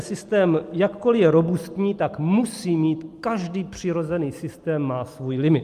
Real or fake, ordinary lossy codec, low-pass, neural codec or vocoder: real; Opus, 32 kbps; 14.4 kHz; none